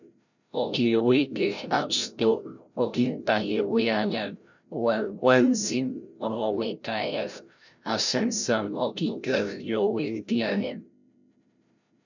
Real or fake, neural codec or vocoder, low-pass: fake; codec, 16 kHz, 0.5 kbps, FreqCodec, larger model; 7.2 kHz